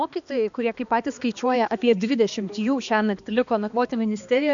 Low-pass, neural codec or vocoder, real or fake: 7.2 kHz; codec, 16 kHz, 2 kbps, X-Codec, HuBERT features, trained on balanced general audio; fake